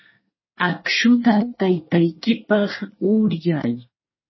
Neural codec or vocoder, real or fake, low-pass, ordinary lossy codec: codec, 24 kHz, 1 kbps, SNAC; fake; 7.2 kHz; MP3, 24 kbps